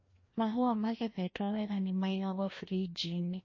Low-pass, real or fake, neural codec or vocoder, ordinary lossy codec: 7.2 kHz; fake; codec, 16 kHz, 1 kbps, FreqCodec, larger model; MP3, 32 kbps